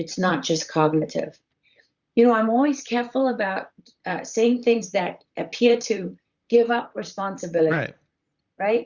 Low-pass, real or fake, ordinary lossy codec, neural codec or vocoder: 7.2 kHz; fake; Opus, 64 kbps; vocoder, 44.1 kHz, 128 mel bands, Pupu-Vocoder